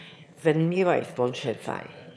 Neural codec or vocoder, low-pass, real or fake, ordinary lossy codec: autoencoder, 22.05 kHz, a latent of 192 numbers a frame, VITS, trained on one speaker; none; fake; none